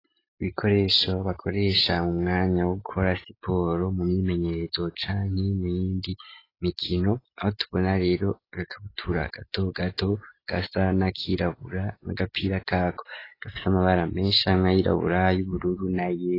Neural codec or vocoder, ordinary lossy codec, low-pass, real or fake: none; AAC, 24 kbps; 5.4 kHz; real